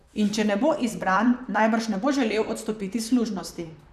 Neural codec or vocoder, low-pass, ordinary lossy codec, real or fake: vocoder, 44.1 kHz, 128 mel bands, Pupu-Vocoder; 14.4 kHz; none; fake